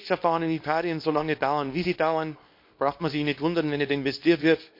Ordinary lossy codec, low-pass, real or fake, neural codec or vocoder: MP3, 32 kbps; 5.4 kHz; fake; codec, 24 kHz, 0.9 kbps, WavTokenizer, small release